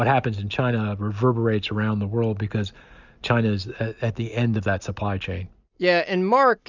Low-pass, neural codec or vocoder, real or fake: 7.2 kHz; none; real